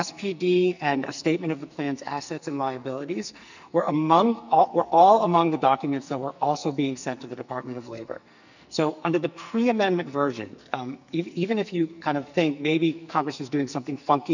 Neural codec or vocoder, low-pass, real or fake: codec, 44.1 kHz, 2.6 kbps, SNAC; 7.2 kHz; fake